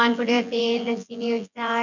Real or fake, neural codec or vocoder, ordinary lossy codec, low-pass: fake; vocoder, 24 kHz, 100 mel bands, Vocos; none; 7.2 kHz